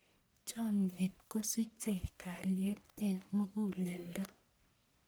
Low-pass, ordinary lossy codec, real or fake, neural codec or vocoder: none; none; fake; codec, 44.1 kHz, 1.7 kbps, Pupu-Codec